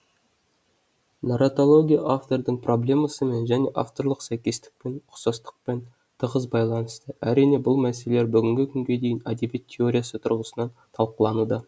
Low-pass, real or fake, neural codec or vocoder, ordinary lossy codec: none; real; none; none